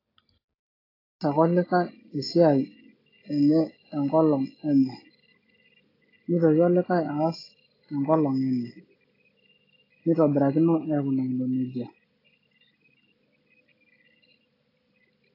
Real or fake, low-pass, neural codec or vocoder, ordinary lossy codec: real; 5.4 kHz; none; AAC, 24 kbps